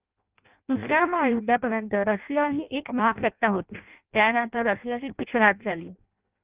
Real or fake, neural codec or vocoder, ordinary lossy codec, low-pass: fake; codec, 16 kHz in and 24 kHz out, 0.6 kbps, FireRedTTS-2 codec; Opus, 24 kbps; 3.6 kHz